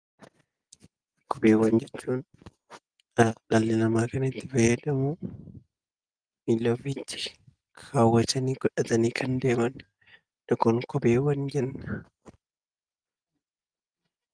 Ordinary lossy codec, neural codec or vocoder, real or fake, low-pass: Opus, 24 kbps; none; real; 9.9 kHz